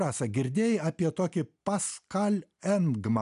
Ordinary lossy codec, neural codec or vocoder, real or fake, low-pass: AAC, 96 kbps; none; real; 10.8 kHz